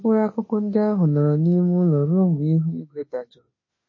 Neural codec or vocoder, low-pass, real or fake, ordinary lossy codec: autoencoder, 48 kHz, 32 numbers a frame, DAC-VAE, trained on Japanese speech; 7.2 kHz; fake; MP3, 32 kbps